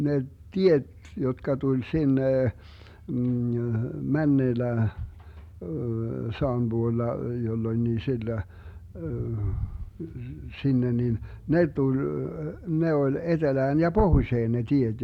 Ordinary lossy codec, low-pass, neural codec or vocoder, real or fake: none; 19.8 kHz; none; real